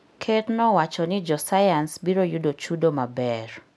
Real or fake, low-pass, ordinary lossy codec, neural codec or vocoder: real; none; none; none